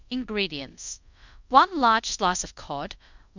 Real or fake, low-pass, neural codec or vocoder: fake; 7.2 kHz; codec, 24 kHz, 0.5 kbps, DualCodec